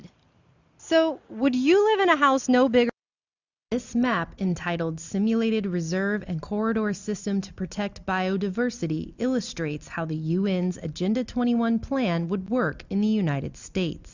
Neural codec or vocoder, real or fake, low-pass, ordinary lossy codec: none; real; 7.2 kHz; Opus, 64 kbps